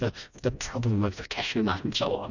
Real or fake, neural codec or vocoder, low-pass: fake; codec, 16 kHz, 1 kbps, FreqCodec, smaller model; 7.2 kHz